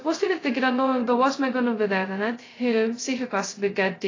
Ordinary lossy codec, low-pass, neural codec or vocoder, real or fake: AAC, 32 kbps; 7.2 kHz; codec, 16 kHz, 0.2 kbps, FocalCodec; fake